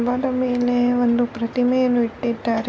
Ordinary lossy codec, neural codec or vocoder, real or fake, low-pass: none; none; real; none